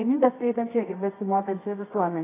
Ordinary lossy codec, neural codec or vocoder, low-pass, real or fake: AAC, 16 kbps; codec, 24 kHz, 0.9 kbps, WavTokenizer, medium music audio release; 3.6 kHz; fake